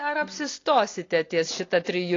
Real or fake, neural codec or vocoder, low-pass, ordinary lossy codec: real; none; 7.2 kHz; AAC, 32 kbps